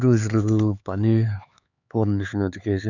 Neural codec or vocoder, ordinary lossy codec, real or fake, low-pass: codec, 16 kHz, 4 kbps, X-Codec, HuBERT features, trained on LibriSpeech; none; fake; 7.2 kHz